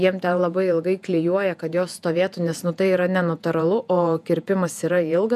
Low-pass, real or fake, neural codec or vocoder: 14.4 kHz; fake; vocoder, 48 kHz, 128 mel bands, Vocos